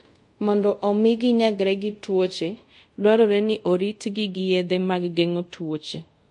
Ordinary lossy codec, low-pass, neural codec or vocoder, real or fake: MP3, 48 kbps; 10.8 kHz; codec, 24 kHz, 0.5 kbps, DualCodec; fake